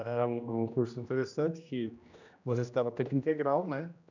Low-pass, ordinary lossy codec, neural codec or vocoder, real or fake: 7.2 kHz; none; codec, 16 kHz, 1 kbps, X-Codec, HuBERT features, trained on general audio; fake